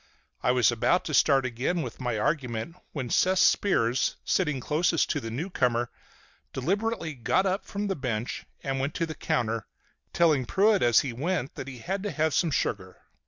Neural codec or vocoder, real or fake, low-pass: none; real; 7.2 kHz